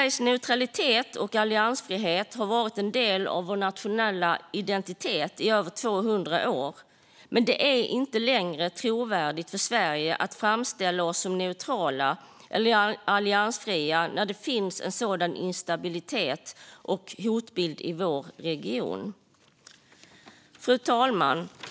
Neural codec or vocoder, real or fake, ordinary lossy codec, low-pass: none; real; none; none